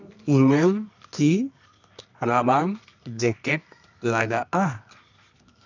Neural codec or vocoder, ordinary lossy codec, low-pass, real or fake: codec, 24 kHz, 0.9 kbps, WavTokenizer, medium music audio release; none; 7.2 kHz; fake